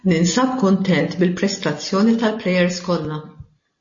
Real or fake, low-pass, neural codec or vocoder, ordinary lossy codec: real; 7.2 kHz; none; MP3, 32 kbps